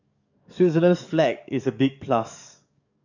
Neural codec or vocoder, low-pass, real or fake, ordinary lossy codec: codec, 44.1 kHz, 7.8 kbps, DAC; 7.2 kHz; fake; none